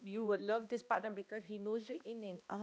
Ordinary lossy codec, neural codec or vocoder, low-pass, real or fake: none; codec, 16 kHz, 1 kbps, X-Codec, HuBERT features, trained on balanced general audio; none; fake